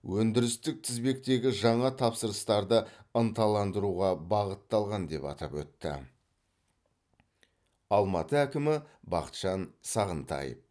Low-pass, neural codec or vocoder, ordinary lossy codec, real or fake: none; none; none; real